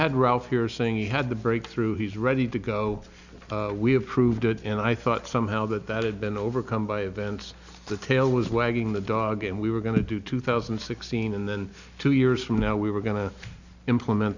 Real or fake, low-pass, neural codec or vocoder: real; 7.2 kHz; none